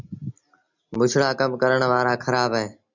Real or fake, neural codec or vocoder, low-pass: real; none; 7.2 kHz